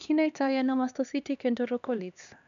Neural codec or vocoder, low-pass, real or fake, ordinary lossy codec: codec, 16 kHz, 2 kbps, X-Codec, HuBERT features, trained on LibriSpeech; 7.2 kHz; fake; none